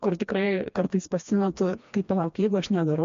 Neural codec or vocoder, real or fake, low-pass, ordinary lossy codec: codec, 16 kHz, 2 kbps, FreqCodec, smaller model; fake; 7.2 kHz; MP3, 48 kbps